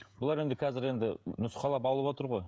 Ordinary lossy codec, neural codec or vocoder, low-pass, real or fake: none; codec, 16 kHz, 16 kbps, FreqCodec, smaller model; none; fake